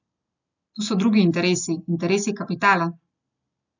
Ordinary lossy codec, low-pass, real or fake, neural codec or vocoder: none; 7.2 kHz; real; none